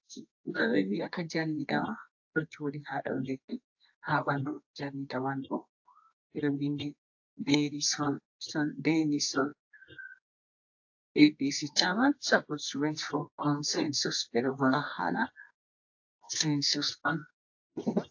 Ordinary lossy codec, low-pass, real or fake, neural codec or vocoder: AAC, 48 kbps; 7.2 kHz; fake; codec, 24 kHz, 0.9 kbps, WavTokenizer, medium music audio release